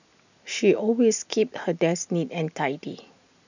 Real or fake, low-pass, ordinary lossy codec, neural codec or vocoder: real; 7.2 kHz; none; none